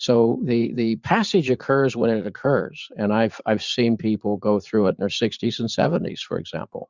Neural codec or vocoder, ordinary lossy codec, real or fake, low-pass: vocoder, 44.1 kHz, 80 mel bands, Vocos; Opus, 64 kbps; fake; 7.2 kHz